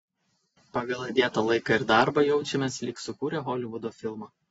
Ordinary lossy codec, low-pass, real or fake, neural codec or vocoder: AAC, 24 kbps; 14.4 kHz; real; none